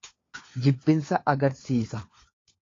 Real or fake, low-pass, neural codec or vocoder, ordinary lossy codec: fake; 7.2 kHz; codec, 16 kHz, 4 kbps, FunCodec, trained on LibriTTS, 50 frames a second; AAC, 32 kbps